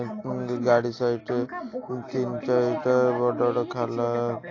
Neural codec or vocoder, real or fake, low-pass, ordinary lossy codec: none; real; 7.2 kHz; AAC, 32 kbps